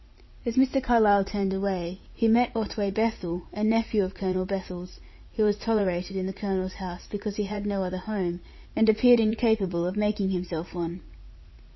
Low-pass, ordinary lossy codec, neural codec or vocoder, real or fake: 7.2 kHz; MP3, 24 kbps; vocoder, 44.1 kHz, 80 mel bands, Vocos; fake